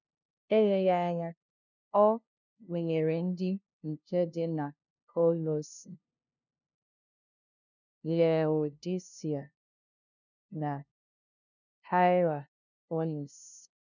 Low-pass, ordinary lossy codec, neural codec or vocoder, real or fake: 7.2 kHz; none; codec, 16 kHz, 0.5 kbps, FunCodec, trained on LibriTTS, 25 frames a second; fake